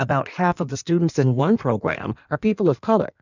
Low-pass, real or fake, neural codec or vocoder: 7.2 kHz; fake; codec, 16 kHz in and 24 kHz out, 1.1 kbps, FireRedTTS-2 codec